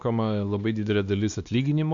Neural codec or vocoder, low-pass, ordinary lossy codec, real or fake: codec, 16 kHz, 2 kbps, X-Codec, WavLM features, trained on Multilingual LibriSpeech; 7.2 kHz; AAC, 64 kbps; fake